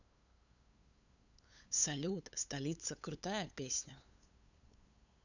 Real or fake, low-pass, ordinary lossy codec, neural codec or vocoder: fake; 7.2 kHz; none; codec, 16 kHz, 8 kbps, FunCodec, trained on LibriTTS, 25 frames a second